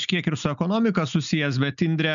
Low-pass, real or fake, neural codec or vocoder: 7.2 kHz; real; none